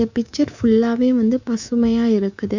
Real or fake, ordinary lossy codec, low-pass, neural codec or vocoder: fake; none; 7.2 kHz; codec, 24 kHz, 3.1 kbps, DualCodec